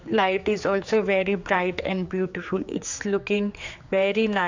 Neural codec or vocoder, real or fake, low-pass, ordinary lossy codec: codec, 16 kHz, 4 kbps, X-Codec, HuBERT features, trained on general audio; fake; 7.2 kHz; AAC, 48 kbps